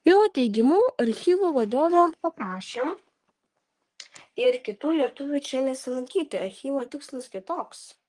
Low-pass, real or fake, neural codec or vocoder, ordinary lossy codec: 10.8 kHz; fake; codec, 44.1 kHz, 3.4 kbps, Pupu-Codec; Opus, 24 kbps